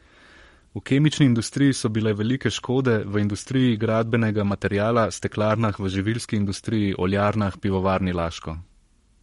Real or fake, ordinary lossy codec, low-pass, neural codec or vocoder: fake; MP3, 48 kbps; 19.8 kHz; codec, 44.1 kHz, 7.8 kbps, Pupu-Codec